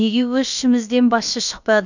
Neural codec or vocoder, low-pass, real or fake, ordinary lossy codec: codec, 16 kHz, about 1 kbps, DyCAST, with the encoder's durations; 7.2 kHz; fake; none